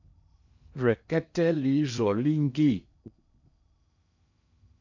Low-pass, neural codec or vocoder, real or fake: 7.2 kHz; codec, 16 kHz in and 24 kHz out, 0.8 kbps, FocalCodec, streaming, 65536 codes; fake